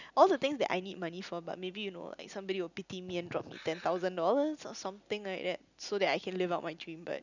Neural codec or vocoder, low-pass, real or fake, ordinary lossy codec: none; 7.2 kHz; real; none